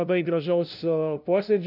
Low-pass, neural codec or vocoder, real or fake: 5.4 kHz; codec, 16 kHz, 0.5 kbps, FunCodec, trained on LibriTTS, 25 frames a second; fake